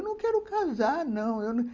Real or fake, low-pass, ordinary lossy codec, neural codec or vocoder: real; 7.2 kHz; Opus, 32 kbps; none